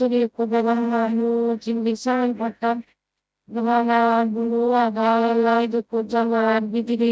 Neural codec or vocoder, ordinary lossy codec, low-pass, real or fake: codec, 16 kHz, 0.5 kbps, FreqCodec, smaller model; none; none; fake